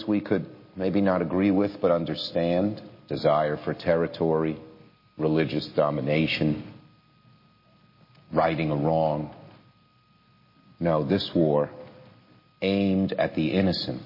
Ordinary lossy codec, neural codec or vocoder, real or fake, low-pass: MP3, 24 kbps; none; real; 5.4 kHz